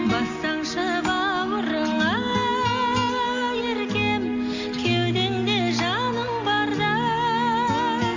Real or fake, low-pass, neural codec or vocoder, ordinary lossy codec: real; 7.2 kHz; none; AAC, 48 kbps